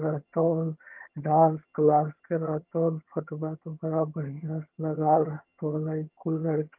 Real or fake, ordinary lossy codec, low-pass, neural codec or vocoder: fake; Opus, 32 kbps; 3.6 kHz; vocoder, 22.05 kHz, 80 mel bands, HiFi-GAN